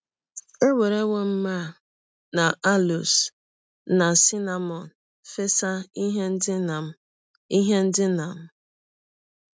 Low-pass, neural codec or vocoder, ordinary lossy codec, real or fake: none; none; none; real